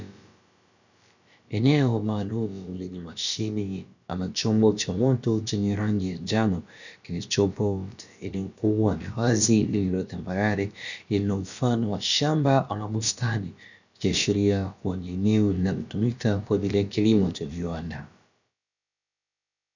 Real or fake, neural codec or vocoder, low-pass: fake; codec, 16 kHz, about 1 kbps, DyCAST, with the encoder's durations; 7.2 kHz